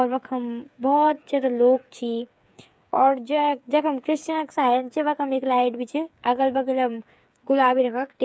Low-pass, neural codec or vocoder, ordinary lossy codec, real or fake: none; codec, 16 kHz, 8 kbps, FreqCodec, smaller model; none; fake